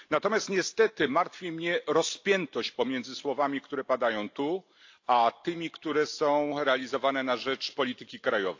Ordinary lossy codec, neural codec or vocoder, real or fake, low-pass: AAC, 48 kbps; none; real; 7.2 kHz